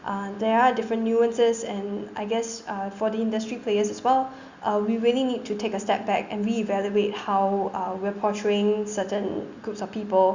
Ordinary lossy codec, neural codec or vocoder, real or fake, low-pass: Opus, 64 kbps; none; real; 7.2 kHz